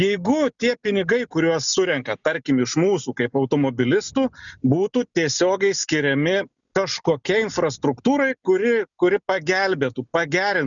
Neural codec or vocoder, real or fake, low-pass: none; real; 7.2 kHz